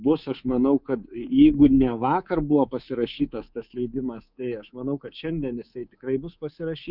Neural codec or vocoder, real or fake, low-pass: codec, 24 kHz, 3.1 kbps, DualCodec; fake; 5.4 kHz